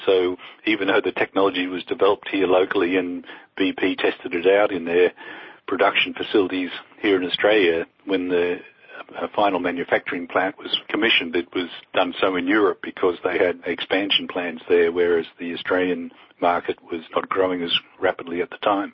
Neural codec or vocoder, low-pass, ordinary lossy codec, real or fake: none; 7.2 kHz; MP3, 24 kbps; real